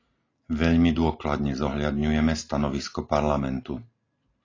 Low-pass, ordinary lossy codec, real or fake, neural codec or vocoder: 7.2 kHz; AAC, 32 kbps; real; none